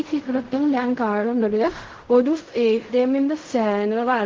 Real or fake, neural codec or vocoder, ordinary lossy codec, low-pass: fake; codec, 16 kHz in and 24 kHz out, 0.4 kbps, LongCat-Audio-Codec, fine tuned four codebook decoder; Opus, 16 kbps; 7.2 kHz